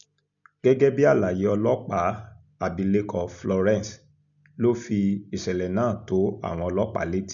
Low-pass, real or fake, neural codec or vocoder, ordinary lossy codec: 7.2 kHz; real; none; none